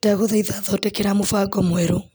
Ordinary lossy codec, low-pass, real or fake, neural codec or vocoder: none; none; real; none